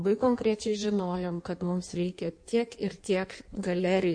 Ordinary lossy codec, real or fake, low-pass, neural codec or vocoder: MP3, 48 kbps; fake; 9.9 kHz; codec, 16 kHz in and 24 kHz out, 1.1 kbps, FireRedTTS-2 codec